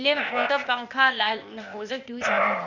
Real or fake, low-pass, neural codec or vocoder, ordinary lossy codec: fake; 7.2 kHz; codec, 16 kHz, 0.8 kbps, ZipCodec; none